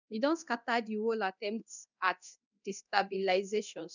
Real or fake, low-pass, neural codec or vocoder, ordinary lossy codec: fake; 7.2 kHz; codec, 24 kHz, 0.9 kbps, DualCodec; none